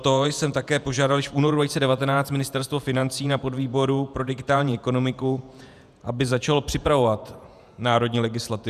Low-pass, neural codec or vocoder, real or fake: 14.4 kHz; vocoder, 48 kHz, 128 mel bands, Vocos; fake